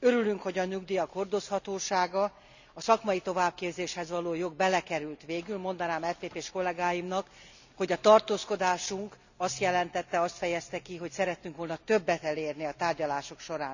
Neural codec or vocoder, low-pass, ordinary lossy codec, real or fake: none; 7.2 kHz; none; real